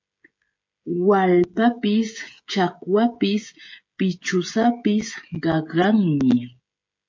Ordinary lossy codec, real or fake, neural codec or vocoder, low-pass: MP3, 48 kbps; fake; codec, 16 kHz, 16 kbps, FreqCodec, smaller model; 7.2 kHz